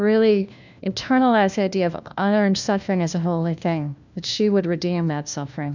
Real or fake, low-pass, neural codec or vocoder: fake; 7.2 kHz; codec, 16 kHz, 1 kbps, FunCodec, trained on LibriTTS, 50 frames a second